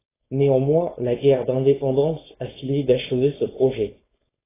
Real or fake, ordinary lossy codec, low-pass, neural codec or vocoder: fake; AAC, 16 kbps; 3.6 kHz; codec, 16 kHz, 4.8 kbps, FACodec